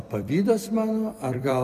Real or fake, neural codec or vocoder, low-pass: fake; vocoder, 44.1 kHz, 128 mel bands every 256 samples, BigVGAN v2; 14.4 kHz